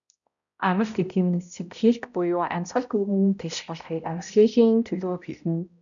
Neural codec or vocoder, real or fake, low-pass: codec, 16 kHz, 0.5 kbps, X-Codec, HuBERT features, trained on balanced general audio; fake; 7.2 kHz